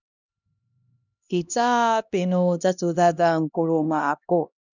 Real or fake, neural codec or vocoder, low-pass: fake; codec, 16 kHz, 1 kbps, X-Codec, HuBERT features, trained on LibriSpeech; 7.2 kHz